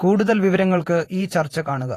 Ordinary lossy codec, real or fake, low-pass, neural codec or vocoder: AAC, 48 kbps; fake; 14.4 kHz; vocoder, 48 kHz, 128 mel bands, Vocos